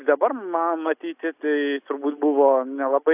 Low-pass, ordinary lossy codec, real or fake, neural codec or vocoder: 3.6 kHz; AAC, 32 kbps; real; none